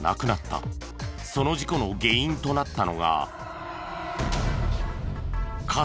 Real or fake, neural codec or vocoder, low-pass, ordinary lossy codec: real; none; none; none